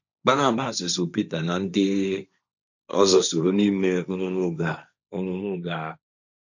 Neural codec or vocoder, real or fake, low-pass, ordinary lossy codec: codec, 16 kHz, 1.1 kbps, Voila-Tokenizer; fake; 7.2 kHz; none